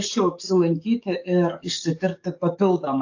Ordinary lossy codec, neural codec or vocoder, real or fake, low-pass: AAC, 48 kbps; codec, 44.1 kHz, 7.8 kbps, DAC; fake; 7.2 kHz